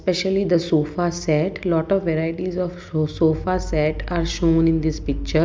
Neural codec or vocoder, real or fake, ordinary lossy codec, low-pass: none; real; none; none